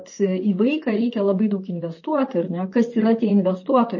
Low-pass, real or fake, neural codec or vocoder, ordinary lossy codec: 7.2 kHz; fake; vocoder, 44.1 kHz, 128 mel bands, Pupu-Vocoder; MP3, 32 kbps